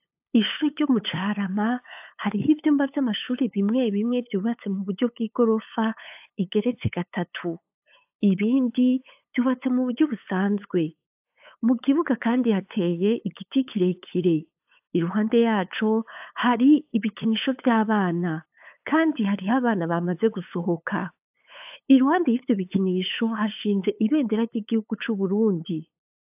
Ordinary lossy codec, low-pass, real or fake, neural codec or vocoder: AAC, 32 kbps; 3.6 kHz; fake; codec, 16 kHz, 8 kbps, FunCodec, trained on LibriTTS, 25 frames a second